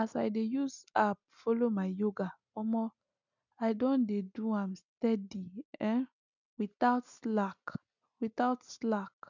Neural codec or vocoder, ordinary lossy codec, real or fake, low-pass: none; none; real; 7.2 kHz